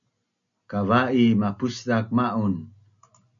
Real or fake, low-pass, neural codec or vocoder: real; 7.2 kHz; none